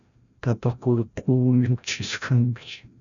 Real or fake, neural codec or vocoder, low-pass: fake; codec, 16 kHz, 0.5 kbps, FreqCodec, larger model; 7.2 kHz